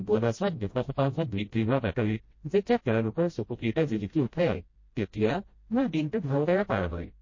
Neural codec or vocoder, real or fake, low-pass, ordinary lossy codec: codec, 16 kHz, 0.5 kbps, FreqCodec, smaller model; fake; 7.2 kHz; MP3, 32 kbps